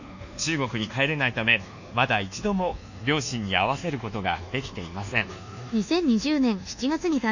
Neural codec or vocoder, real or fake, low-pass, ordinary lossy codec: codec, 24 kHz, 1.2 kbps, DualCodec; fake; 7.2 kHz; none